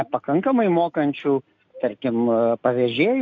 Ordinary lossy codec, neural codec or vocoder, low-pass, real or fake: AAC, 48 kbps; none; 7.2 kHz; real